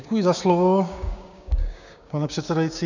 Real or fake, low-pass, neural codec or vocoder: fake; 7.2 kHz; codec, 16 kHz, 6 kbps, DAC